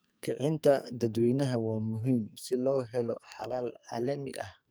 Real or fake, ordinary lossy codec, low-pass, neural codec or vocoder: fake; none; none; codec, 44.1 kHz, 2.6 kbps, SNAC